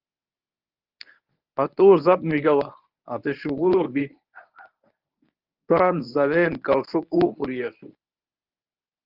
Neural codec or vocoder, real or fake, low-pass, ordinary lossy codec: codec, 24 kHz, 0.9 kbps, WavTokenizer, medium speech release version 1; fake; 5.4 kHz; Opus, 32 kbps